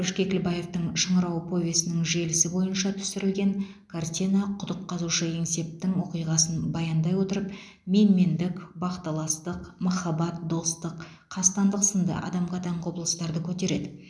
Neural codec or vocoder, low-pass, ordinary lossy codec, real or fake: none; none; none; real